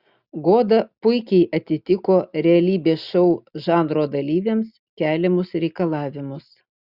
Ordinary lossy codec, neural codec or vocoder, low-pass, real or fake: Opus, 64 kbps; none; 5.4 kHz; real